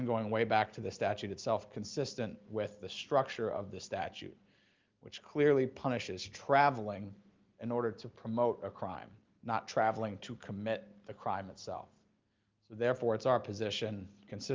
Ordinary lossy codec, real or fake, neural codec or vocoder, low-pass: Opus, 32 kbps; real; none; 7.2 kHz